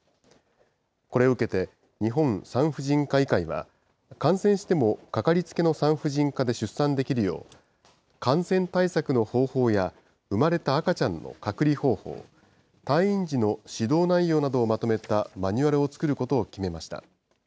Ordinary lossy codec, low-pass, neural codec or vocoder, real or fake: none; none; none; real